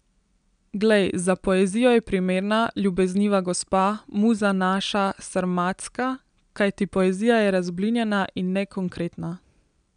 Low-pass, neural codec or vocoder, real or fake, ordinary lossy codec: 9.9 kHz; none; real; none